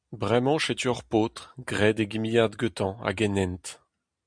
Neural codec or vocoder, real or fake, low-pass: none; real; 9.9 kHz